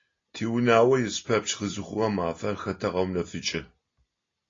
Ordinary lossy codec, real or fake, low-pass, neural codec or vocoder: AAC, 32 kbps; real; 7.2 kHz; none